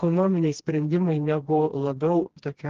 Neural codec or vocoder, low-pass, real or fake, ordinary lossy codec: codec, 16 kHz, 2 kbps, FreqCodec, smaller model; 7.2 kHz; fake; Opus, 16 kbps